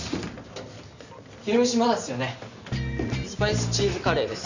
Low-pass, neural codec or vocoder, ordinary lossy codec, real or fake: 7.2 kHz; none; none; real